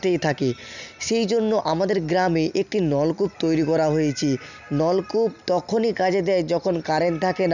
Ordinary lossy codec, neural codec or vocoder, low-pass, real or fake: none; none; 7.2 kHz; real